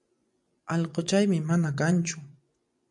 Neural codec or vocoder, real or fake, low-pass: vocoder, 24 kHz, 100 mel bands, Vocos; fake; 10.8 kHz